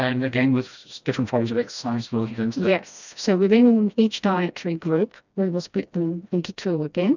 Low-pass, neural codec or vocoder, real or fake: 7.2 kHz; codec, 16 kHz, 1 kbps, FreqCodec, smaller model; fake